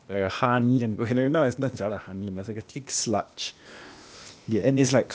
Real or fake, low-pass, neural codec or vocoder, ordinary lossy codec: fake; none; codec, 16 kHz, 0.8 kbps, ZipCodec; none